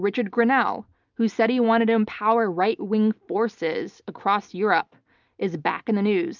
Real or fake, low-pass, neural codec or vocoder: real; 7.2 kHz; none